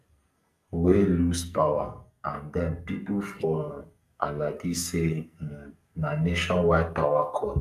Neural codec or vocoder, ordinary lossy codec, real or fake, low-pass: codec, 44.1 kHz, 2.6 kbps, SNAC; none; fake; 14.4 kHz